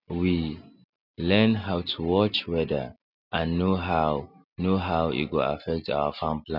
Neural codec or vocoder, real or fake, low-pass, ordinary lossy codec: none; real; 5.4 kHz; none